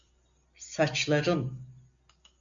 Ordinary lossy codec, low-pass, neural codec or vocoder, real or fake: MP3, 64 kbps; 7.2 kHz; none; real